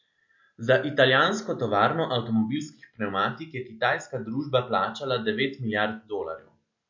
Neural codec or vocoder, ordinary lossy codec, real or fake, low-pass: none; MP3, 48 kbps; real; 7.2 kHz